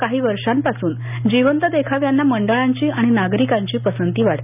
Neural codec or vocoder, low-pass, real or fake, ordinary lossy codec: none; 3.6 kHz; real; none